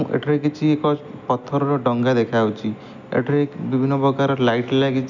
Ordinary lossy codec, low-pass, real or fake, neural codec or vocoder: none; 7.2 kHz; real; none